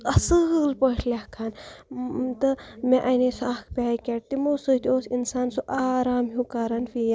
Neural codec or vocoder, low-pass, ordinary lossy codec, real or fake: none; none; none; real